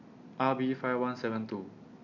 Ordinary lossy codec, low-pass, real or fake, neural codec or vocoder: none; 7.2 kHz; real; none